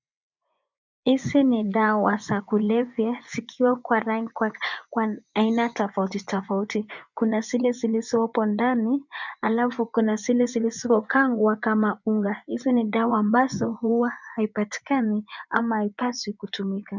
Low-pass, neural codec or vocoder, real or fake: 7.2 kHz; vocoder, 24 kHz, 100 mel bands, Vocos; fake